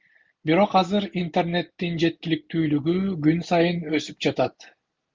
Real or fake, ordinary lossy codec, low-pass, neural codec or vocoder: real; Opus, 32 kbps; 7.2 kHz; none